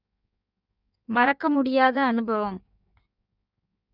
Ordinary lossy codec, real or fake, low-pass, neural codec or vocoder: none; fake; 5.4 kHz; codec, 16 kHz in and 24 kHz out, 1.1 kbps, FireRedTTS-2 codec